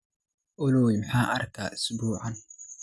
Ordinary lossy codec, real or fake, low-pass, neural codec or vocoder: none; real; none; none